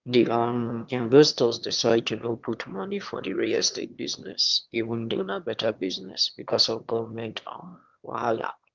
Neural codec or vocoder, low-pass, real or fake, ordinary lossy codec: autoencoder, 22.05 kHz, a latent of 192 numbers a frame, VITS, trained on one speaker; 7.2 kHz; fake; Opus, 24 kbps